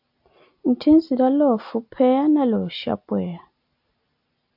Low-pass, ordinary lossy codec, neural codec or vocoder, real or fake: 5.4 kHz; AAC, 48 kbps; none; real